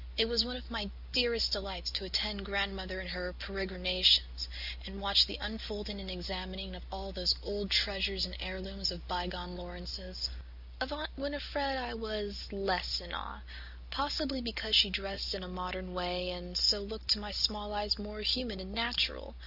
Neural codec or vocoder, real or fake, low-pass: none; real; 5.4 kHz